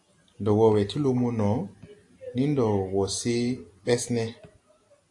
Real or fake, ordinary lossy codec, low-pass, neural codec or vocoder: real; AAC, 64 kbps; 10.8 kHz; none